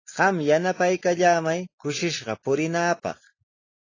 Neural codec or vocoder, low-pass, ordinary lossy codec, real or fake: none; 7.2 kHz; AAC, 32 kbps; real